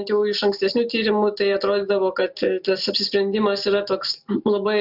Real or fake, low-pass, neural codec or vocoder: real; 5.4 kHz; none